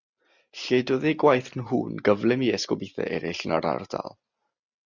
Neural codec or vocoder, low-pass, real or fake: none; 7.2 kHz; real